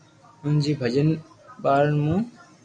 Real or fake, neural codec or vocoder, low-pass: real; none; 9.9 kHz